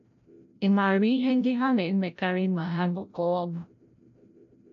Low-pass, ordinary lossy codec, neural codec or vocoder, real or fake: 7.2 kHz; none; codec, 16 kHz, 0.5 kbps, FreqCodec, larger model; fake